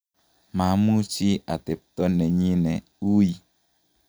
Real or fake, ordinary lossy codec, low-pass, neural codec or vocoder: real; none; none; none